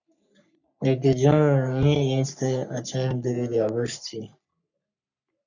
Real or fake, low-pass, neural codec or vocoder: fake; 7.2 kHz; codec, 44.1 kHz, 3.4 kbps, Pupu-Codec